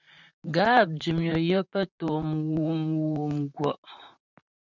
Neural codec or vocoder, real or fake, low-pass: vocoder, 44.1 kHz, 128 mel bands, Pupu-Vocoder; fake; 7.2 kHz